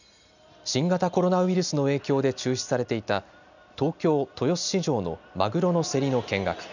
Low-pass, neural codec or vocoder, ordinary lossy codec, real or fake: 7.2 kHz; none; none; real